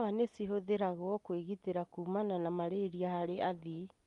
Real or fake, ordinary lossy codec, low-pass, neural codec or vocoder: real; Opus, 32 kbps; 10.8 kHz; none